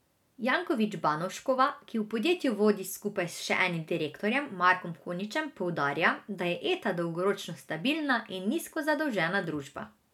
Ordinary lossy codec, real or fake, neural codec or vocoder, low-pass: none; real; none; 19.8 kHz